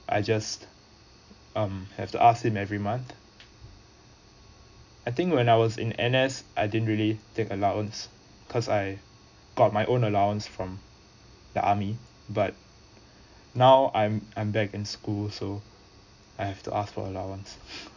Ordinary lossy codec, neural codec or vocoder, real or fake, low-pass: none; none; real; 7.2 kHz